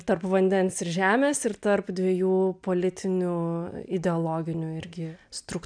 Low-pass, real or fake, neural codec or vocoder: 9.9 kHz; real; none